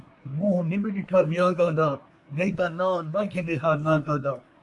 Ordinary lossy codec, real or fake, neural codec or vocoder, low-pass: AAC, 64 kbps; fake; codec, 24 kHz, 1 kbps, SNAC; 10.8 kHz